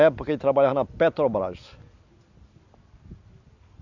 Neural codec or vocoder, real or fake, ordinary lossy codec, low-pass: none; real; none; 7.2 kHz